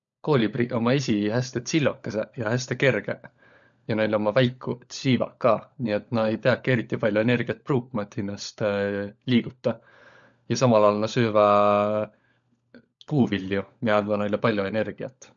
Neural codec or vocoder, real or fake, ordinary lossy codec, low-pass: codec, 16 kHz, 16 kbps, FunCodec, trained on LibriTTS, 50 frames a second; fake; MP3, 96 kbps; 7.2 kHz